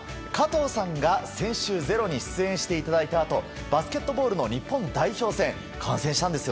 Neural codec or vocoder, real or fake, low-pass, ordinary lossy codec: none; real; none; none